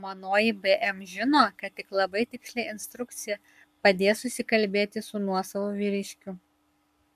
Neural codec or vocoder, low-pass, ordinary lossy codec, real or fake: codec, 44.1 kHz, 7.8 kbps, Pupu-Codec; 14.4 kHz; MP3, 96 kbps; fake